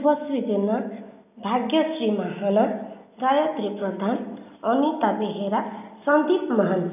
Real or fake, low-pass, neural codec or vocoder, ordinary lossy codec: real; 3.6 kHz; none; none